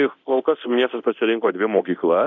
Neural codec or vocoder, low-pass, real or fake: codec, 24 kHz, 0.9 kbps, DualCodec; 7.2 kHz; fake